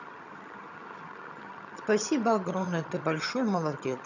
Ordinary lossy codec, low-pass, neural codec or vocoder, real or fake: none; 7.2 kHz; vocoder, 22.05 kHz, 80 mel bands, HiFi-GAN; fake